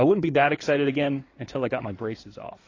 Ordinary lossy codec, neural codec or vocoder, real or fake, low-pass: AAC, 32 kbps; codec, 16 kHz in and 24 kHz out, 2.2 kbps, FireRedTTS-2 codec; fake; 7.2 kHz